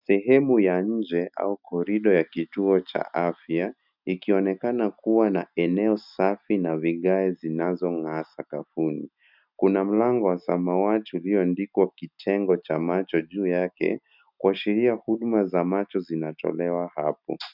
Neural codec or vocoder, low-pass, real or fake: none; 5.4 kHz; real